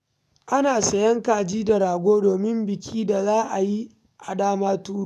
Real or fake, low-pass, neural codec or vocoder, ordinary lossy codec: fake; 14.4 kHz; codec, 44.1 kHz, 7.8 kbps, DAC; none